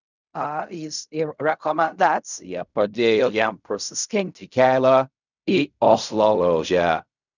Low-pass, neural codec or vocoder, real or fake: 7.2 kHz; codec, 16 kHz in and 24 kHz out, 0.4 kbps, LongCat-Audio-Codec, fine tuned four codebook decoder; fake